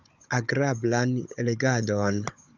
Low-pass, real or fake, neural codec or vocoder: 7.2 kHz; fake; codec, 16 kHz, 16 kbps, FunCodec, trained on Chinese and English, 50 frames a second